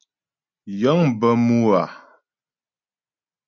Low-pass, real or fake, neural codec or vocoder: 7.2 kHz; real; none